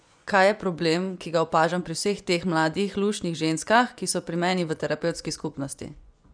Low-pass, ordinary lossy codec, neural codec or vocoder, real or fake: 9.9 kHz; none; vocoder, 24 kHz, 100 mel bands, Vocos; fake